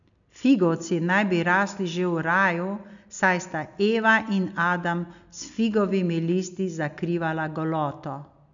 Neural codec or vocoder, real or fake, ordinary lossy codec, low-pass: none; real; none; 7.2 kHz